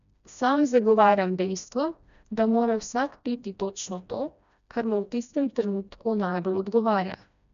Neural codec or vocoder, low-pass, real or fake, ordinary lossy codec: codec, 16 kHz, 1 kbps, FreqCodec, smaller model; 7.2 kHz; fake; none